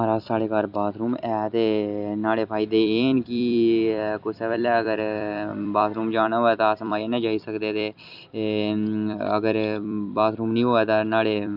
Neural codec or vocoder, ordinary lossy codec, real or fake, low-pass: vocoder, 44.1 kHz, 128 mel bands every 256 samples, BigVGAN v2; none; fake; 5.4 kHz